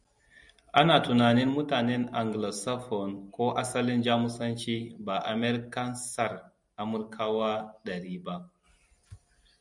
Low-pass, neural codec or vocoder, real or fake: 10.8 kHz; none; real